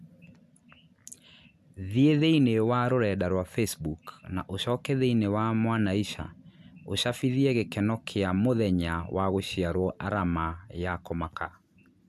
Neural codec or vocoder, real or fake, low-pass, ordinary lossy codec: none; real; 14.4 kHz; MP3, 96 kbps